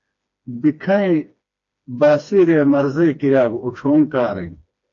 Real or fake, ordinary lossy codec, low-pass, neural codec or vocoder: fake; AAC, 48 kbps; 7.2 kHz; codec, 16 kHz, 2 kbps, FreqCodec, smaller model